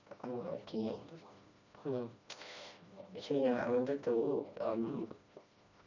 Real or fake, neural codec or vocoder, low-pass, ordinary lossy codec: fake; codec, 16 kHz, 1 kbps, FreqCodec, smaller model; 7.2 kHz; none